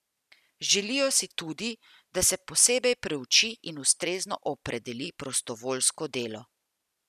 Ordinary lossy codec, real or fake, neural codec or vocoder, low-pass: none; real; none; 14.4 kHz